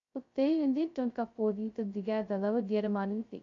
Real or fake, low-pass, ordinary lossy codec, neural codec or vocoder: fake; 7.2 kHz; MP3, 48 kbps; codec, 16 kHz, 0.2 kbps, FocalCodec